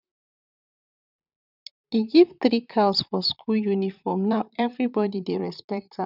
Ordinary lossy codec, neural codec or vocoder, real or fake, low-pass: none; vocoder, 44.1 kHz, 128 mel bands, Pupu-Vocoder; fake; 5.4 kHz